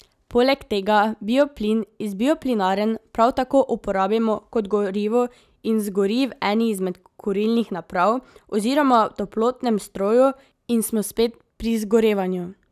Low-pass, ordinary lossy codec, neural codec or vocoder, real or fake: 14.4 kHz; none; none; real